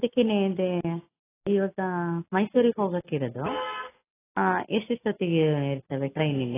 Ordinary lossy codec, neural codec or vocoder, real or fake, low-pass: AAC, 16 kbps; none; real; 3.6 kHz